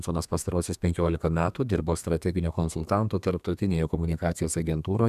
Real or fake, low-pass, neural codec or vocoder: fake; 14.4 kHz; codec, 32 kHz, 1.9 kbps, SNAC